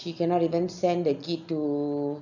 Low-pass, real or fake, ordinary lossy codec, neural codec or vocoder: 7.2 kHz; real; none; none